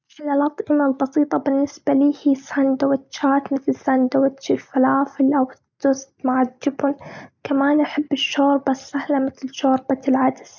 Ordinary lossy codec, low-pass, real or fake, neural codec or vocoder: none; 7.2 kHz; real; none